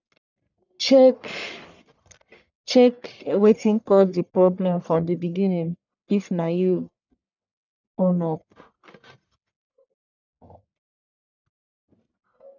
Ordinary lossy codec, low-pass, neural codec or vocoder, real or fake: none; 7.2 kHz; codec, 44.1 kHz, 1.7 kbps, Pupu-Codec; fake